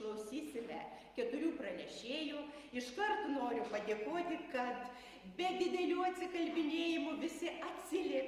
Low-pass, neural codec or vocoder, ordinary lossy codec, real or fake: 14.4 kHz; none; Opus, 32 kbps; real